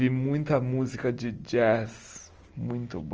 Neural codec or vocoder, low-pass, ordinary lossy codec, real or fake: none; 7.2 kHz; Opus, 24 kbps; real